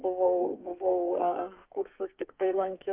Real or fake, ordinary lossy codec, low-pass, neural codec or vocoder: fake; Opus, 24 kbps; 3.6 kHz; codec, 44.1 kHz, 2.6 kbps, SNAC